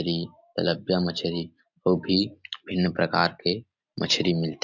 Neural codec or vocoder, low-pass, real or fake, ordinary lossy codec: none; 7.2 kHz; real; MP3, 64 kbps